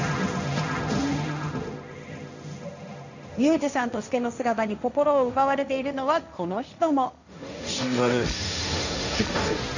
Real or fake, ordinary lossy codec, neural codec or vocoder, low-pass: fake; none; codec, 16 kHz, 1.1 kbps, Voila-Tokenizer; 7.2 kHz